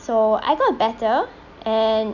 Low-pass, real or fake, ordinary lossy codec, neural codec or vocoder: 7.2 kHz; real; none; none